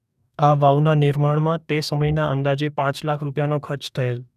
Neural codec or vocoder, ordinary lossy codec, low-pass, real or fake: codec, 44.1 kHz, 2.6 kbps, DAC; none; 14.4 kHz; fake